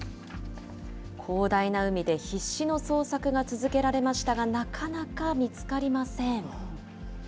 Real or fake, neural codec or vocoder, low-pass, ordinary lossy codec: real; none; none; none